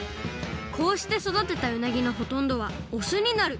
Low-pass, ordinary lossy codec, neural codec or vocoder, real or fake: none; none; none; real